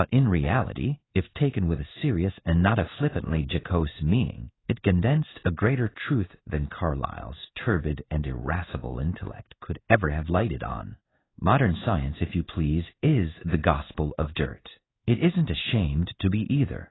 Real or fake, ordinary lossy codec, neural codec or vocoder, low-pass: fake; AAC, 16 kbps; codec, 16 kHz in and 24 kHz out, 1 kbps, XY-Tokenizer; 7.2 kHz